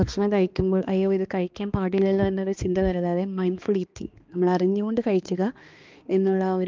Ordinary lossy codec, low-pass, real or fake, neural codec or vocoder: Opus, 24 kbps; 7.2 kHz; fake; codec, 16 kHz, 2 kbps, X-Codec, HuBERT features, trained on balanced general audio